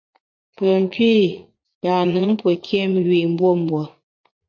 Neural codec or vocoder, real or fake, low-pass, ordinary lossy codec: vocoder, 44.1 kHz, 80 mel bands, Vocos; fake; 7.2 kHz; MP3, 48 kbps